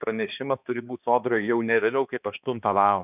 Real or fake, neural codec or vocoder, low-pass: fake; codec, 16 kHz, 2 kbps, X-Codec, HuBERT features, trained on balanced general audio; 3.6 kHz